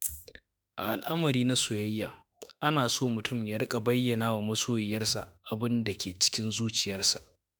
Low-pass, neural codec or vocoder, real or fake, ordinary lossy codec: none; autoencoder, 48 kHz, 32 numbers a frame, DAC-VAE, trained on Japanese speech; fake; none